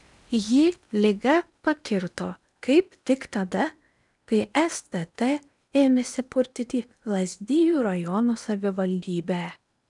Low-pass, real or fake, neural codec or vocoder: 10.8 kHz; fake; codec, 16 kHz in and 24 kHz out, 0.8 kbps, FocalCodec, streaming, 65536 codes